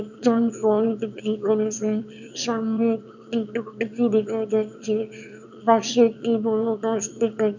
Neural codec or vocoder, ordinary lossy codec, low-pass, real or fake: autoencoder, 22.05 kHz, a latent of 192 numbers a frame, VITS, trained on one speaker; none; 7.2 kHz; fake